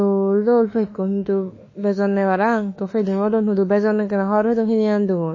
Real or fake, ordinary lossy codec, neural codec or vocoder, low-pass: fake; MP3, 32 kbps; autoencoder, 48 kHz, 32 numbers a frame, DAC-VAE, trained on Japanese speech; 7.2 kHz